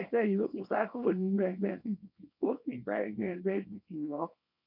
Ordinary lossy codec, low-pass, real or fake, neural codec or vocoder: none; 5.4 kHz; fake; codec, 24 kHz, 0.9 kbps, WavTokenizer, small release